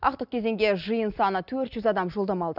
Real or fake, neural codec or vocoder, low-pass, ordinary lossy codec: real; none; 5.4 kHz; none